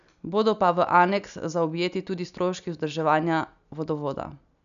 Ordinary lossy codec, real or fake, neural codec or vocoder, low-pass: none; real; none; 7.2 kHz